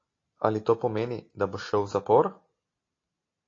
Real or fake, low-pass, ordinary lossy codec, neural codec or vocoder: real; 7.2 kHz; AAC, 32 kbps; none